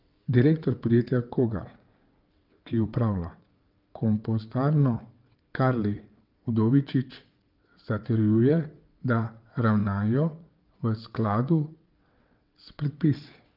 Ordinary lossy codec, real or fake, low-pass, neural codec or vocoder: Opus, 24 kbps; fake; 5.4 kHz; vocoder, 22.05 kHz, 80 mel bands, WaveNeXt